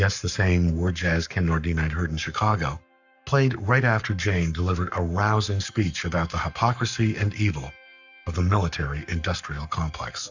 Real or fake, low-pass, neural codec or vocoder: fake; 7.2 kHz; codec, 44.1 kHz, 7.8 kbps, Pupu-Codec